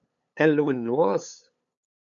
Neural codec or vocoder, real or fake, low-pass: codec, 16 kHz, 8 kbps, FunCodec, trained on LibriTTS, 25 frames a second; fake; 7.2 kHz